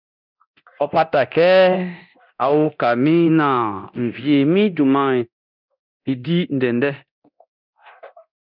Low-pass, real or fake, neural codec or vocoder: 5.4 kHz; fake; codec, 24 kHz, 0.9 kbps, DualCodec